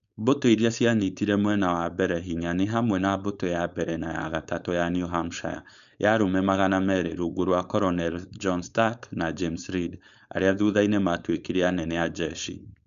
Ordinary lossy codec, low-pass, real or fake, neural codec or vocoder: none; 7.2 kHz; fake; codec, 16 kHz, 4.8 kbps, FACodec